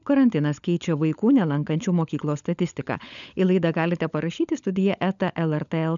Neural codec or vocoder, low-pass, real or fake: codec, 16 kHz, 16 kbps, FunCodec, trained on LibriTTS, 50 frames a second; 7.2 kHz; fake